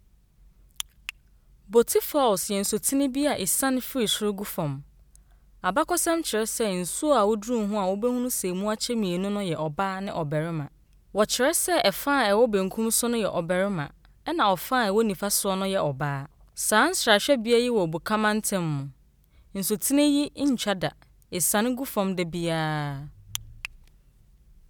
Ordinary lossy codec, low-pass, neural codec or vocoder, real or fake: none; none; none; real